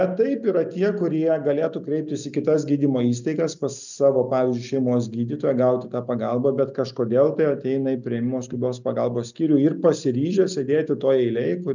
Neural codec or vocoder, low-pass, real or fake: none; 7.2 kHz; real